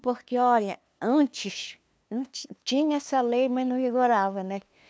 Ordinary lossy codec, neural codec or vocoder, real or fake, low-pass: none; codec, 16 kHz, 2 kbps, FunCodec, trained on LibriTTS, 25 frames a second; fake; none